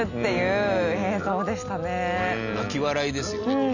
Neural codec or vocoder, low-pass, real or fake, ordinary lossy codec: none; 7.2 kHz; real; none